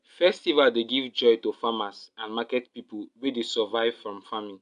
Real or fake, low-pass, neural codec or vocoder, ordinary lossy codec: real; 10.8 kHz; none; MP3, 64 kbps